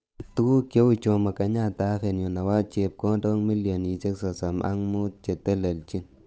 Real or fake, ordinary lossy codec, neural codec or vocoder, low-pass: fake; none; codec, 16 kHz, 8 kbps, FunCodec, trained on Chinese and English, 25 frames a second; none